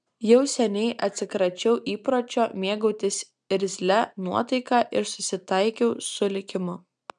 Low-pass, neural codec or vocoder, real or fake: 9.9 kHz; none; real